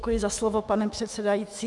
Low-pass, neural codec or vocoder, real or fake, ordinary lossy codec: 10.8 kHz; autoencoder, 48 kHz, 128 numbers a frame, DAC-VAE, trained on Japanese speech; fake; Opus, 64 kbps